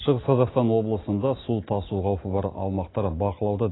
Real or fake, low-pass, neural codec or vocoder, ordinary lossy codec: real; 7.2 kHz; none; AAC, 16 kbps